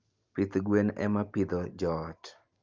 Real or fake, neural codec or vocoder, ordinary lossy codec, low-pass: real; none; Opus, 24 kbps; 7.2 kHz